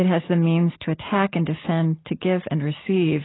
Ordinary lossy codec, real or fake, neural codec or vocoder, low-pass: AAC, 16 kbps; real; none; 7.2 kHz